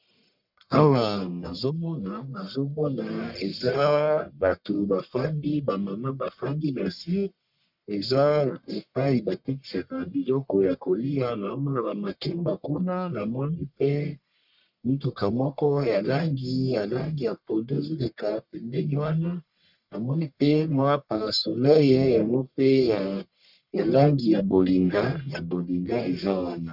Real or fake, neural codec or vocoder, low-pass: fake; codec, 44.1 kHz, 1.7 kbps, Pupu-Codec; 5.4 kHz